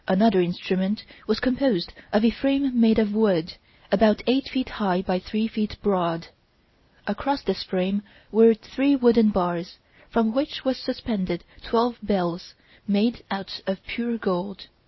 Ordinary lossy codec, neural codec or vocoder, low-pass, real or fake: MP3, 24 kbps; none; 7.2 kHz; real